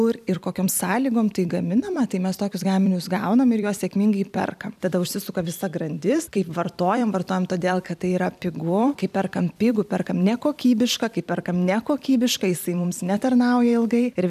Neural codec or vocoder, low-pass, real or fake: vocoder, 44.1 kHz, 128 mel bands every 256 samples, BigVGAN v2; 14.4 kHz; fake